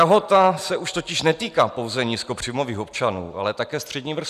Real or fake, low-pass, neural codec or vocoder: real; 14.4 kHz; none